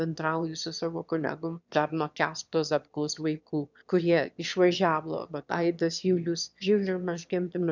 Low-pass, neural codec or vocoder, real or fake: 7.2 kHz; autoencoder, 22.05 kHz, a latent of 192 numbers a frame, VITS, trained on one speaker; fake